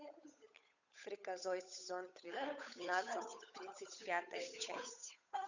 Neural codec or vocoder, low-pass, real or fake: codec, 16 kHz, 8 kbps, FunCodec, trained on Chinese and English, 25 frames a second; 7.2 kHz; fake